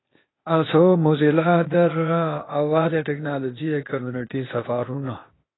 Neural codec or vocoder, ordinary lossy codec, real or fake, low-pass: codec, 16 kHz, 0.8 kbps, ZipCodec; AAC, 16 kbps; fake; 7.2 kHz